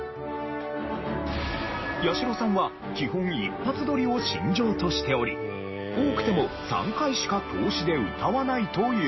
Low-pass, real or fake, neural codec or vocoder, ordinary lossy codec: 7.2 kHz; real; none; MP3, 24 kbps